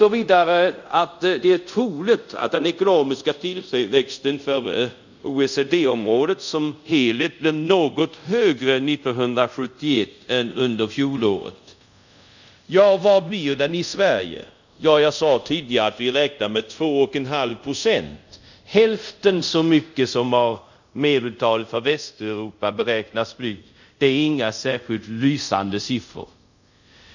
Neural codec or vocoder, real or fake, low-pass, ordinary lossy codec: codec, 24 kHz, 0.5 kbps, DualCodec; fake; 7.2 kHz; none